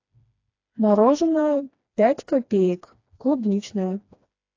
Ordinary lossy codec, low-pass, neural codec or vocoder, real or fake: AAC, 48 kbps; 7.2 kHz; codec, 16 kHz, 2 kbps, FreqCodec, smaller model; fake